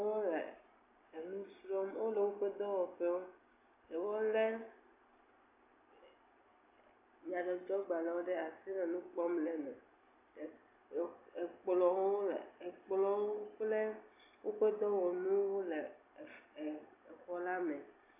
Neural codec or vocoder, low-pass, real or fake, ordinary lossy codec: none; 3.6 kHz; real; AAC, 24 kbps